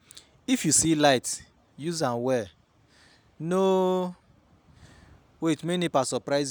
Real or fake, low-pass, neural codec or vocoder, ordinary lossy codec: real; none; none; none